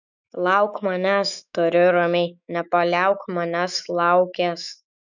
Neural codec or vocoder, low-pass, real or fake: autoencoder, 48 kHz, 128 numbers a frame, DAC-VAE, trained on Japanese speech; 7.2 kHz; fake